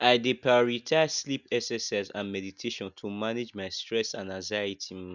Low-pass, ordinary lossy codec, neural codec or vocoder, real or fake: 7.2 kHz; none; none; real